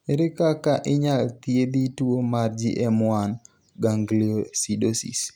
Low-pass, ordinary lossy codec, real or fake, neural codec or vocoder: none; none; real; none